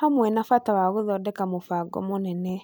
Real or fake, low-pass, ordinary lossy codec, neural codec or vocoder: real; none; none; none